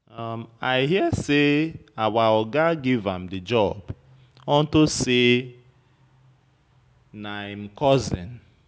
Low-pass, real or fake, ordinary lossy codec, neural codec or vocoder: none; real; none; none